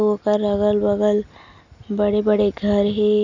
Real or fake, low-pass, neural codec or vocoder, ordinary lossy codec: real; 7.2 kHz; none; none